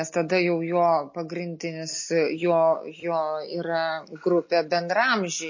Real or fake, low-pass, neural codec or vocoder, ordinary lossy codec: real; 7.2 kHz; none; MP3, 32 kbps